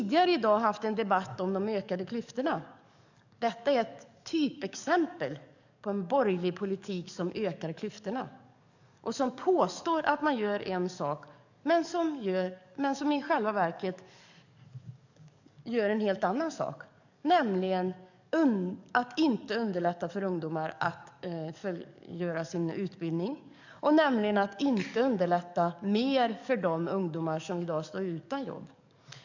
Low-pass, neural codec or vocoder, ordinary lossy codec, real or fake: 7.2 kHz; codec, 44.1 kHz, 7.8 kbps, DAC; none; fake